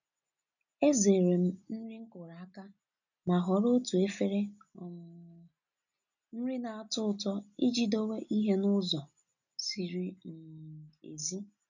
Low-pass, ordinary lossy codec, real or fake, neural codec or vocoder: 7.2 kHz; none; real; none